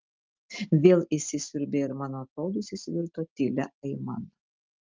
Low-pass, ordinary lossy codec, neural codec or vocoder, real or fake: 7.2 kHz; Opus, 24 kbps; none; real